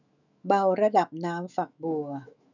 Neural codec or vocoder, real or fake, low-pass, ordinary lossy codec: autoencoder, 48 kHz, 128 numbers a frame, DAC-VAE, trained on Japanese speech; fake; 7.2 kHz; none